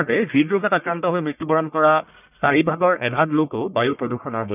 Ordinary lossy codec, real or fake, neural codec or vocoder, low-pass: none; fake; codec, 44.1 kHz, 1.7 kbps, Pupu-Codec; 3.6 kHz